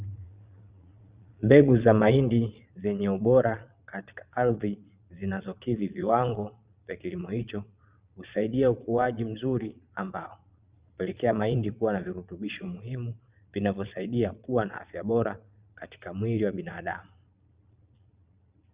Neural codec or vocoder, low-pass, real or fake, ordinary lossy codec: vocoder, 22.05 kHz, 80 mel bands, Vocos; 3.6 kHz; fake; Opus, 64 kbps